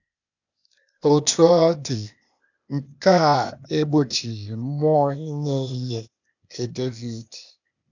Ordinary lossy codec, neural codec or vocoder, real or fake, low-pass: none; codec, 16 kHz, 0.8 kbps, ZipCodec; fake; 7.2 kHz